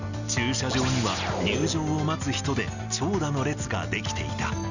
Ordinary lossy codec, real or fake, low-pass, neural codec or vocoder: none; real; 7.2 kHz; none